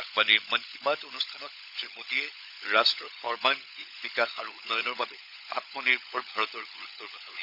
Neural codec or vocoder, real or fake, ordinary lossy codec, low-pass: codec, 16 kHz, 16 kbps, FunCodec, trained on LibriTTS, 50 frames a second; fake; none; 5.4 kHz